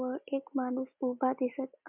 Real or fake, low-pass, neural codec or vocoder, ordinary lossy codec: real; 3.6 kHz; none; MP3, 32 kbps